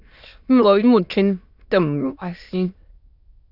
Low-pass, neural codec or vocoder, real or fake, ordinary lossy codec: 5.4 kHz; autoencoder, 22.05 kHz, a latent of 192 numbers a frame, VITS, trained on many speakers; fake; Opus, 64 kbps